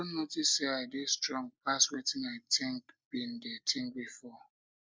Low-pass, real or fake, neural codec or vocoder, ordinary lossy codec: none; real; none; none